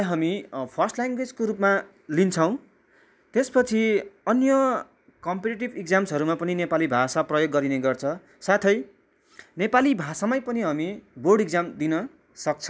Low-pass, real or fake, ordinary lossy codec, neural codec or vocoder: none; real; none; none